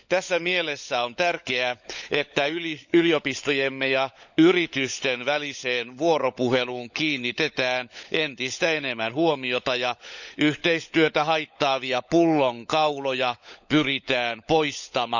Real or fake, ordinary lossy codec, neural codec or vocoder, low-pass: fake; none; codec, 16 kHz, 16 kbps, FunCodec, trained on LibriTTS, 50 frames a second; 7.2 kHz